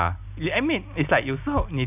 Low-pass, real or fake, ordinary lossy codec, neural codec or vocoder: 3.6 kHz; real; none; none